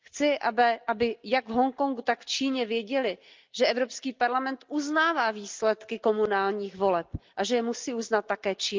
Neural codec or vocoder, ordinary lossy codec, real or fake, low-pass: none; Opus, 24 kbps; real; 7.2 kHz